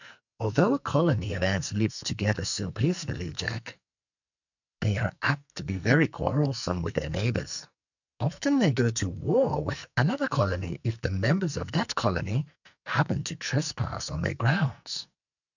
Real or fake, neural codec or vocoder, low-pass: fake; codec, 44.1 kHz, 2.6 kbps, SNAC; 7.2 kHz